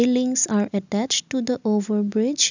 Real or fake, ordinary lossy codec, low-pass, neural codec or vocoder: real; none; 7.2 kHz; none